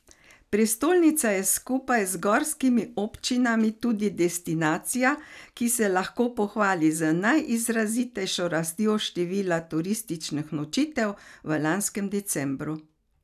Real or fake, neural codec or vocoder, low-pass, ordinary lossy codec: real; none; 14.4 kHz; none